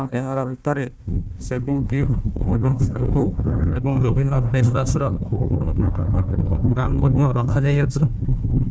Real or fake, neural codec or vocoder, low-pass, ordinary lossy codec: fake; codec, 16 kHz, 1 kbps, FunCodec, trained on Chinese and English, 50 frames a second; none; none